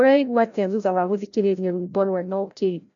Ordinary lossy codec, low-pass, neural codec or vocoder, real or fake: none; 7.2 kHz; codec, 16 kHz, 0.5 kbps, FreqCodec, larger model; fake